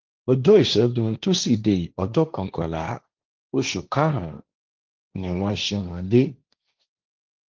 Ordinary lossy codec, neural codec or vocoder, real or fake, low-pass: Opus, 32 kbps; codec, 16 kHz, 1.1 kbps, Voila-Tokenizer; fake; 7.2 kHz